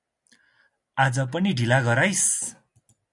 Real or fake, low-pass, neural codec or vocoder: real; 10.8 kHz; none